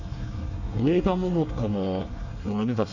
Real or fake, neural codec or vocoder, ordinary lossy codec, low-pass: fake; codec, 24 kHz, 1 kbps, SNAC; none; 7.2 kHz